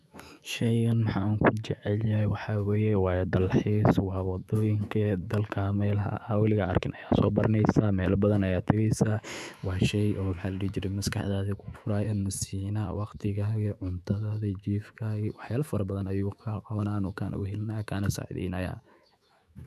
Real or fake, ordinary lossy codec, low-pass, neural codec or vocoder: fake; none; 14.4 kHz; autoencoder, 48 kHz, 128 numbers a frame, DAC-VAE, trained on Japanese speech